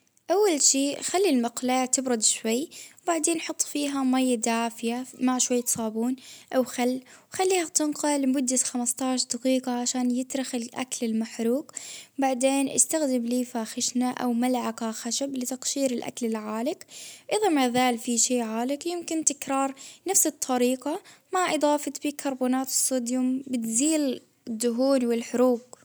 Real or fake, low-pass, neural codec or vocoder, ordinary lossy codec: real; none; none; none